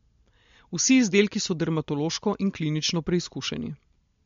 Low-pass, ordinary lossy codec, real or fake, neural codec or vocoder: 7.2 kHz; MP3, 48 kbps; real; none